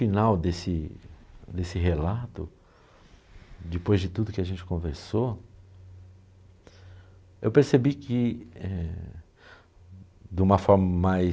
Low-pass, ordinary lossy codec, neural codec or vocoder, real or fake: none; none; none; real